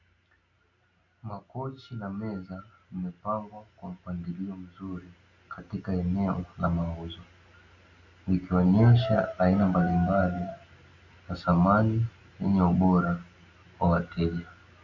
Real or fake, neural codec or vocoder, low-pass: real; none; 7.2 kHz